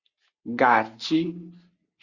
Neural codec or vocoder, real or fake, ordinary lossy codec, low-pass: none; real; Opus, 64 kbps; 7.2 kHz